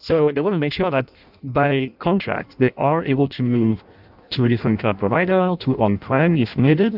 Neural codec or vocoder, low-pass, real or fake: codec, 16 kHz in and 24 kHz out, 0.6 kbps, FireRedTTS-2 codec; 5.4 kHz; fake